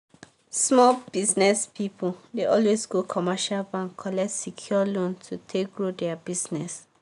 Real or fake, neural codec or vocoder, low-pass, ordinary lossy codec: real; none; 10.8 kHz; none